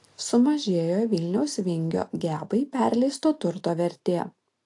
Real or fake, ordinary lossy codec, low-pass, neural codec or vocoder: real; AAC, 48 kbps; 10.8 kHz; none